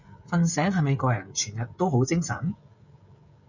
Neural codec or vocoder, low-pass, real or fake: codec, 16 kHz, 16 kbps, FreqCodec, smaller model; 7.2 kHz; fake